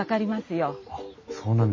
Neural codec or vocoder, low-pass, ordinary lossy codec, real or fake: none; 7.2 kHz; MP3, 32 kbps; real